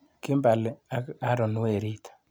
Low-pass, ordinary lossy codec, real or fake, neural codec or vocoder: none; none; real; none